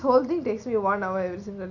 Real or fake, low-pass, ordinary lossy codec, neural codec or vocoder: real; 7.2 kHz; none; none